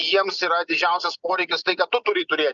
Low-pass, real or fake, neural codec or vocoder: 7.2 kHz; real; none